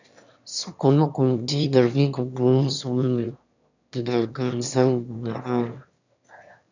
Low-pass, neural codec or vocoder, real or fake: 7.2 kHz; autoencoder, 22.05 kHz, a latent of 192 numbers a frame, VITS, trained on one speaker; fake